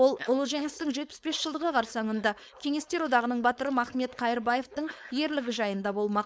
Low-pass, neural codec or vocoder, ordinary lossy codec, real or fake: none; codec, 16 kHz, 4.8 kbps, FACodec; none; fake